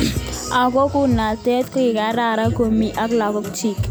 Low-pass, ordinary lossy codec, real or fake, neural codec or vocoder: none; none; real; none